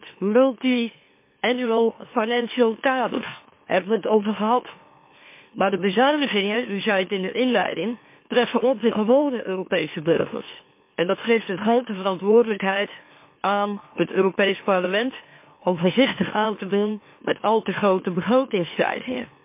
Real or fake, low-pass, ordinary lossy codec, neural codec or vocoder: fake; 3.6 kHz; MP3, 24 kbps; autoencoder, 44.1 kHz, a latent of 192 numbers a frame, MeloTTS